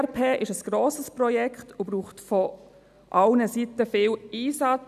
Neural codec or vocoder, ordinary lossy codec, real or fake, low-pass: none; none; real; 14.4 kHz